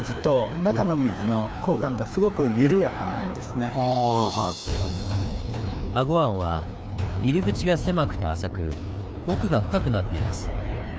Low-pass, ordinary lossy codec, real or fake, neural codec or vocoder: none; none; fake; codec, 16 kHz, 2 kbps, FreqCodec, larger model